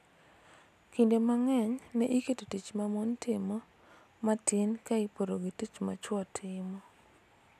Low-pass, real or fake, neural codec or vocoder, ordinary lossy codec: 14.4 kHz; real; none; none